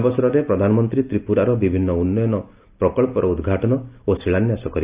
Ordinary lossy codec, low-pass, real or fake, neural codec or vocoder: Opus, 24 kbps; 3.6 kHz; real; none